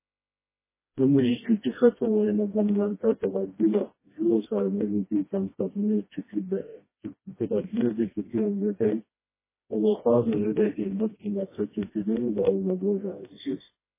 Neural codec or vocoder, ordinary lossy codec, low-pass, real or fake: codec, 16 kHz, 1 kbps, FreqCodec, smaller model; MP3, 16 kbps; 3.6 kHz; fake